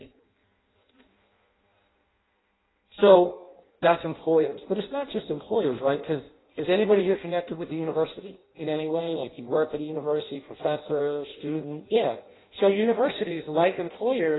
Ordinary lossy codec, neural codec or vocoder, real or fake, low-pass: AAC, 16 kbps; codec, 16 kHz in and 24 kHz out, 0.6 kbps, FireRedTTS-2 codec; fake; 7.2 kHz